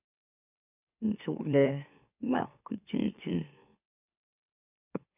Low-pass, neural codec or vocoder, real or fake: 3.6 kHz; autoencoder, 44.1 kHz, a latent of 192 numbers a frame, MeloTTS; fake